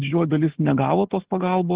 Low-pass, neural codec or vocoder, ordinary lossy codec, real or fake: 3.6 kHz; none; Opus, 16 kbps; real